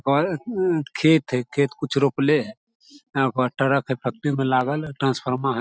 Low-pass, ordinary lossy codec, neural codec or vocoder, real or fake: none; none; none; real